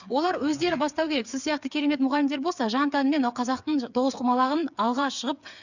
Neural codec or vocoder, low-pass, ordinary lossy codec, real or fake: codec, 16 kHz, 8 kbps, FreqCodec, smaller model; 7.2 kHz; none; fake